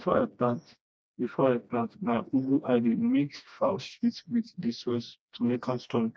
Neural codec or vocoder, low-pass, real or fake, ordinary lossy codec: codec, 16 kHz, 1 kbps, FreqCodec, smaller model; none; fake; none